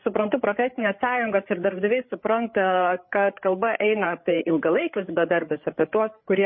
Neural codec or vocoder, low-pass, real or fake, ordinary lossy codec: codec, 16 kHz, 8 kbps, FreqCodec, larger model; 7.2 kHz; fake; MP3, 24 kbps